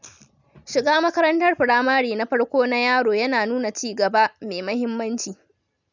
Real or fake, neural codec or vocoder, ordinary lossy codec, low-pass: real; none; none; 7.2 kHz